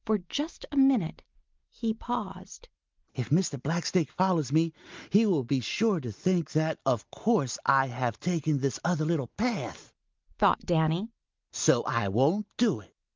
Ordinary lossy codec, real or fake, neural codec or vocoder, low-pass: Opus, 32 kbps; real; none; 7.2 kHz